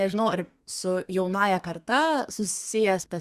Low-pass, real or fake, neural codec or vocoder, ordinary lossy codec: 14.4 kHz; fake; codec, 32 kHz, 1.9 kbps, SNAC; Opus, 64 kbps